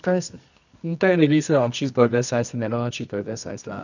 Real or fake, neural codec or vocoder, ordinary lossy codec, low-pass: fake; codec, 24 kHz, 0.9 kbps, WavTokenizer, medium music audio release; none; 7.2 kHz